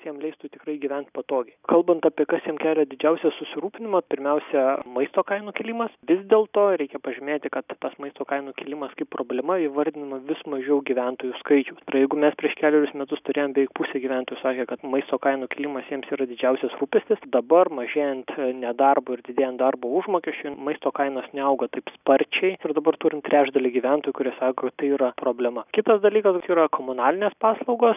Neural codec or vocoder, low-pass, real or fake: none; 3.6 kHz; real